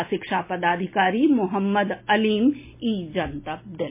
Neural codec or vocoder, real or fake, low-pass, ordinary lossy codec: none; real; 3.6 kHz; MP3, 24 kbps